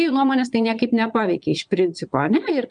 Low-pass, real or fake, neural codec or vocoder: 9.9 kHz; fake; vocoder, 22.05 kHz, 80 mel bands, Vocos